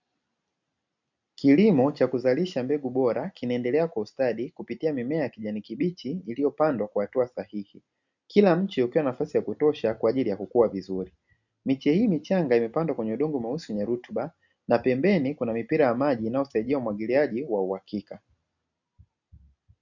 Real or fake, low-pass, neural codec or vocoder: real; 7.2 kHz; none